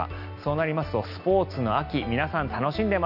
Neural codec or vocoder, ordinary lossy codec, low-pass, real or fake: none; none; 5.4 kHz; real